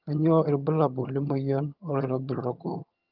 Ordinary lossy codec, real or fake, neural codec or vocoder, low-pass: Opus, 24 kbps; fake; vocoder, 22.05 kHz, 80 mel bands, HiFi-GAN; 5.4 kHz